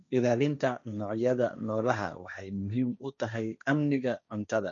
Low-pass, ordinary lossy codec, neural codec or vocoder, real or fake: 7.2 kHz; none; codec, 16 kHz, 1.1 kbps, Voila-Tokenizer; fake